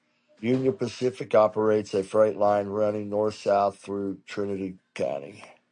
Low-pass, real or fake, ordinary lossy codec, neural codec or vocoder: 9.9 kHz; real; MP3, 64 kbps; none